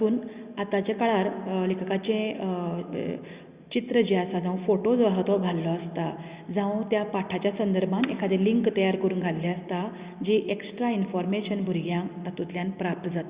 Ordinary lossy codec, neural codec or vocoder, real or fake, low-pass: Opus, 64 kbps; none; real; 3.6 kHz